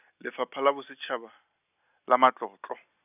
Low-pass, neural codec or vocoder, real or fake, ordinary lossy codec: 3.6 kHz; none; real; none